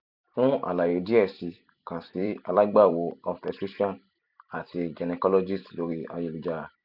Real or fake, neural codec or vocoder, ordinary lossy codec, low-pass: fake; vocoder, 44.1 kHz, 128 mel bands every 256 samples, BigVGAN v2; none; 5.4 kHz